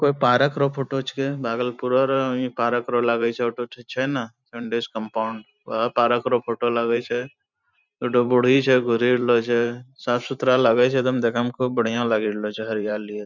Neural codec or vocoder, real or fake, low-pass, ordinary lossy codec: vocoder, 44.1 kHz, 128 mel bands every 512 samples, BigVGAN v2; fake; 7.2 kHz; none